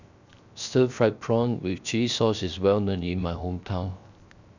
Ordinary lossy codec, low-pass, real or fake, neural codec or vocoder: none; 7.2 kHz; fake; codec, 16 kHz, 0.7 kbps, FocalCodec